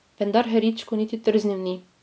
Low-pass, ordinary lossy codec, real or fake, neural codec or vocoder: none; none; real; none